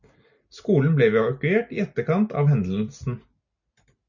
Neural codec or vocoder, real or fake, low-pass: none; real; 7.2 kHz